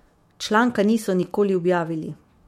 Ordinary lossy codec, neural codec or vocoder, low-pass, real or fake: MP3, 64 kbps; autoencoder, 48 kHz, 128 numbers a frame, DAC-VAE, trained on Japanese speech; 19.8 kHz; fake